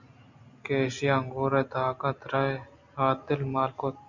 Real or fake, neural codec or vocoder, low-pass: real; none; 7.2 kHz